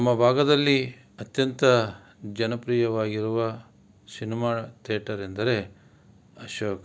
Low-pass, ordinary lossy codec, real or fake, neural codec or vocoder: none; none; real; none